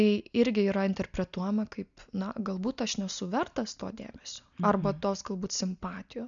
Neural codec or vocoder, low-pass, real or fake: none; 7.2 kHz; real